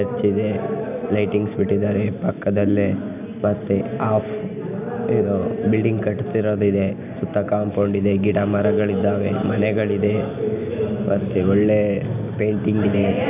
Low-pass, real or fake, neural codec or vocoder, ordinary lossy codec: 3.6 kHz; real; none; none